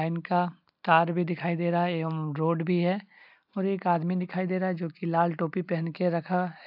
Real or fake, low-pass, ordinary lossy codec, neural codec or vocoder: real; 5.4 kHz; AAC, 48 kbps; none